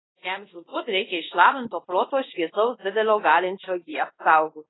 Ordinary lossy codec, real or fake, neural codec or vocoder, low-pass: AAC, 16 kbps; fake; codec, 24 kHz, 0.5 kbps, DualCodec; 7.2 kHz